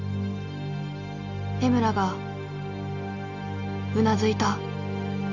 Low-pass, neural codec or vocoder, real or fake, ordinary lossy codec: 7.2 kHz; none; real; AAC, 48 kbps